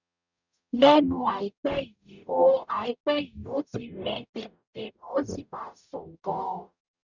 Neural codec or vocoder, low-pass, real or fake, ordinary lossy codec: codec, 44.1 kHz, 0.9 kbps, DAC; 7.2 kHz; fake; none